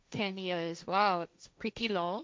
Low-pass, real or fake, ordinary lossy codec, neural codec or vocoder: none; fake; none; codec, 16 kHz, 1.1 kbps, Voila-Tokenizer